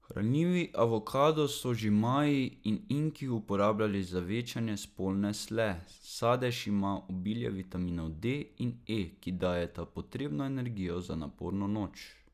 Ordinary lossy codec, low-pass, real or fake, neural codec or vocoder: none; 14.4 kHz; real; none